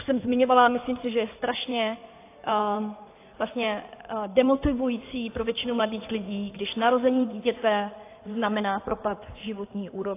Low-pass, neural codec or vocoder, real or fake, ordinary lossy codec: 3.6 kHz; codec, 16 kHz in and 24 kHz out, 2.2 kbps, FireRedTTS-2 codec; fake; AAC, 24 kbps